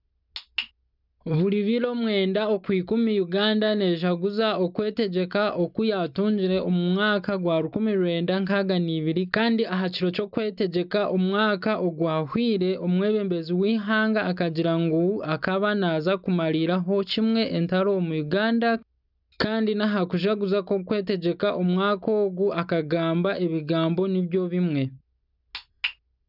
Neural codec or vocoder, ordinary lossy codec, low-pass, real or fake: none; none; 5.4 kHz; real